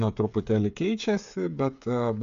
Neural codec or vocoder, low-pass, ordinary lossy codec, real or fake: codec, 16 kHz, 8 kbps, FreqCodec, smaller model; 7.2 kHz; AAC, 64 kbps; fake